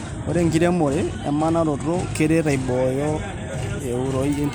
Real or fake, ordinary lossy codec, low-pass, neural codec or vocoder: real; none; none; none